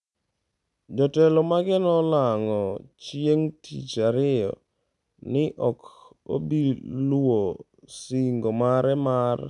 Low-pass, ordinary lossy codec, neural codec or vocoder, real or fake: 10.8 kHz; none; none; real